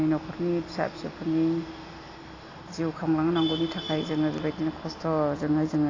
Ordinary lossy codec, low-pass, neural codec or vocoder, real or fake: AAC, 32 kbps; 7.2 kHz; none; real